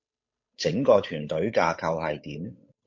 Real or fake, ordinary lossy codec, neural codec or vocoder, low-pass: fake; MP3, 48 kbps; codec, 16 kHz, 8 kbps, FunCodec, trained on Chinese and English, 25 frames a second; 7.2 kHz